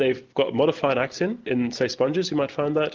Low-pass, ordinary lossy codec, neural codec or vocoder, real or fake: 7.2 kHz; Opus, 32 kbps; none; real